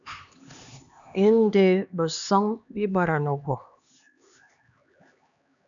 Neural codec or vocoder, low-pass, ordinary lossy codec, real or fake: codec, 16 kHz, 2 kbps, X-Codec, HuBERT features, trained on LibriSpeech; 7.2 kHz; MP3, 96 kbps; fake